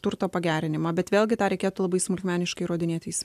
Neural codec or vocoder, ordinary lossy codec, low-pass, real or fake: none; AAC, 96 kbps; 14.4 kHz; real